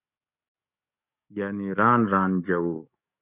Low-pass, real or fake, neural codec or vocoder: 3.6 kHz; fake; codec, 44.1 kHz, 7.8 kbps, DAC